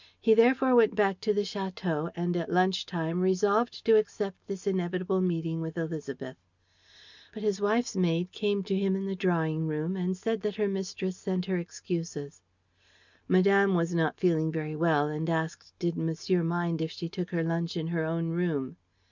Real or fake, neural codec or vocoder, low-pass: real; none; 7.2 kHz